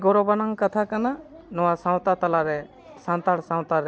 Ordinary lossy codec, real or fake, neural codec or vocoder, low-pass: none; real; none; none